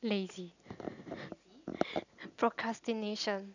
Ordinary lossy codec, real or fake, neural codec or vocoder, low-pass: none; real; none; 7.2 kHz